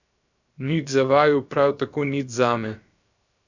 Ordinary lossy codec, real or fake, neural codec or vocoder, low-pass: none; fake; codec, 16 kHz, 0.7 kbps, FocalCodec; 7.2 kHz